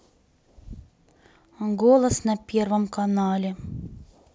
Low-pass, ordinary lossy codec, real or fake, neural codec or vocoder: none; none; real; none